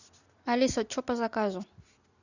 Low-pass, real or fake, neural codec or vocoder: 7.2 kHz; real; none